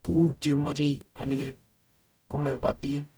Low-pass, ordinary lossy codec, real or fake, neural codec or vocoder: none; none; fake; codec, 44.1 kHz, 0.9 kbps, DAC